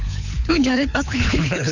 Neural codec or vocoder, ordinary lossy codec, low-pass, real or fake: codec, 24 kHz, 6 kbps, HILCodec; none; 7.2 kHz; fake